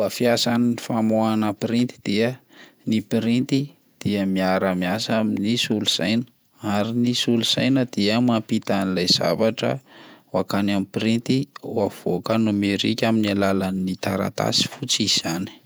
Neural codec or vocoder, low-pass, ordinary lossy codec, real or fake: vocoder, 48 kHz, 128 mel bands, Vocos; none; none; fake